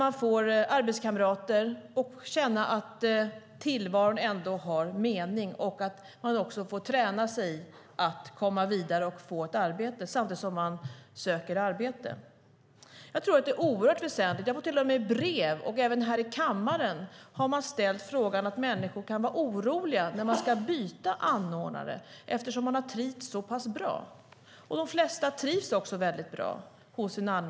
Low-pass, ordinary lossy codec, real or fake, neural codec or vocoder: none; none; real; none